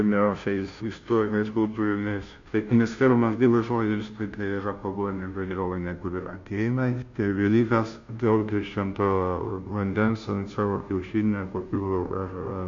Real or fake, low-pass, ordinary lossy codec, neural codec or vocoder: fake; 7.2 kHz; MP3, 48 kbps; codec, 16 kHz, 0.5 kbps, FunCodec, trained on Chinese and English, 25 frames a second